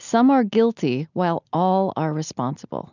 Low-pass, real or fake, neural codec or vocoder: 7.2 kHz; real; none